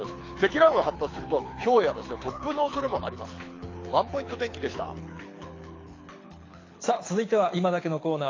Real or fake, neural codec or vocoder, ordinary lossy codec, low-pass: fake; codec, 24 kHz, 6 kbps, HILCodec; AAC, 32 kbps; 7.2 kHz